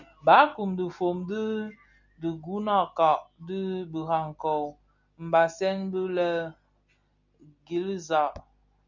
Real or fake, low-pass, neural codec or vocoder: real; 7.2 kHz; none